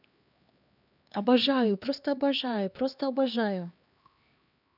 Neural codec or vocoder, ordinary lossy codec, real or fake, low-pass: codec, 16 kHz, 2 kbps, X-Codec, HuBERT features, trained on LibriSpeech; none; fake; 5.4 kHz